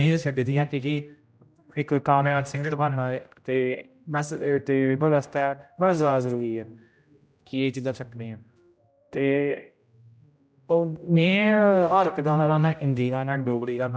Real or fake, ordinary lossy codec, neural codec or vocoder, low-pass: fake; none; codec, 16 kHz, 0.5 kbps, X-Codec, HuBERT features, trained on general audio; none